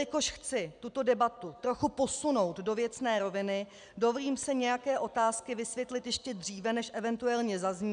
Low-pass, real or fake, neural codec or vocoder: 9.9 kHz; real; none